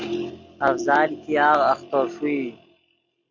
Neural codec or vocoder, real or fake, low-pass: none; real; 7.2 kHz